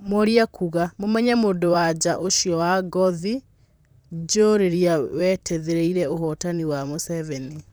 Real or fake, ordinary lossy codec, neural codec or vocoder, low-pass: fake; none; vocoder, 44.1 kHz, 128 mel bands, Pupu-Vocoder; none